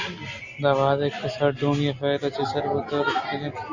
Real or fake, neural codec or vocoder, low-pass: real; none; 7.2 kHz